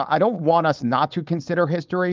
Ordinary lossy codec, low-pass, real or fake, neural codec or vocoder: Opus, 32 kbps; 7.2 kHz; fake; codec, 16 kHz, 4.8 kbps, FACodec